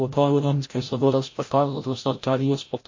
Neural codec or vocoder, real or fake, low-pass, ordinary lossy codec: codec, 16 kHz, 0.5 kbps, FreqCodec, larger model; fake; 7.2 kHz; MP3, 32 kbps